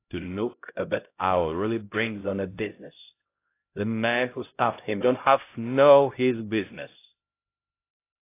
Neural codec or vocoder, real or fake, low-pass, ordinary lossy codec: codec, 16 kHz, 0.5 kbps, X-Codec, HuBERT features, trained on LibriSpeech; fake; 3.6 kHz; AAC, 24 kbps